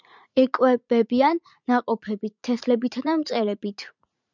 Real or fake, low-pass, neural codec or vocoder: fake; 7.2 kHz; vocoder, 44.1 kHz, 80 mel bands, Vocos